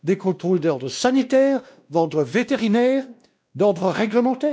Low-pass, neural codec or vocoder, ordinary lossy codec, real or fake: none; codec, 16 kHz, 1 kbps, X-Codec, WavLM features, trained on Multilingual LibriSpeech; none; fake